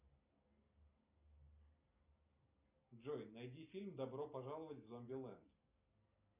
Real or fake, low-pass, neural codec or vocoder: real; 3.6 kHz; none